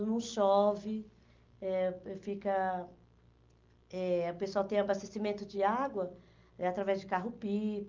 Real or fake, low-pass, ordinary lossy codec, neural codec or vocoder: real; 7.2 kHz; Opus, 24 kbps; none